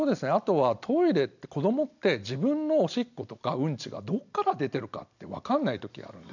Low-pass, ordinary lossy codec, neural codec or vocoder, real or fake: 7.2 kHz; none; none; real